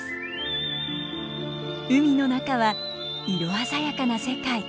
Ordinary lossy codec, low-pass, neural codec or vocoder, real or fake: none; none; none; real